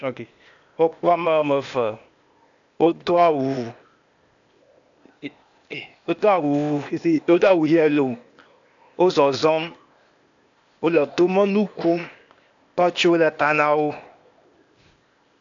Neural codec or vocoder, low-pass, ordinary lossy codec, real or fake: codec, 16 kHz, 0.8 kbps, ZipCodec; 7.2 kHz; AAC, 64 kbps; fake